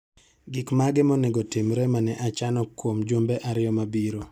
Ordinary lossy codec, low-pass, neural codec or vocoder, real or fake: none; 19.8 kHz; none; real